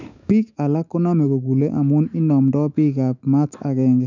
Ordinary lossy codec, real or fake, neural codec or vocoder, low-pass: none; fake; autoencoder, 48 kHz, 128 numbers a frame, DAC-VAE, trained on Japanese speech; 7.2 kHz